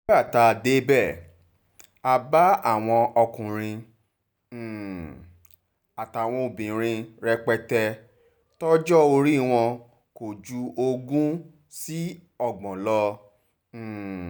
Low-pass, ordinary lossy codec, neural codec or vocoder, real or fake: none; none; none; real